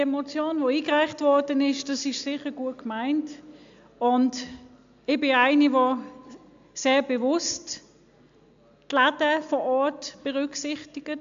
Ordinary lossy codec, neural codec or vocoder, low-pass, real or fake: AAC, 64 kbps; none; 7.2 kHz; real